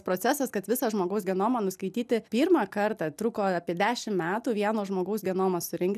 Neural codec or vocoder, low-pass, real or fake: none; 14.4 kHz; real